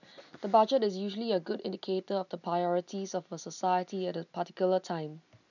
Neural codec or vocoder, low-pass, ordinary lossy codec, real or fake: none; 7.2 kHz; none; real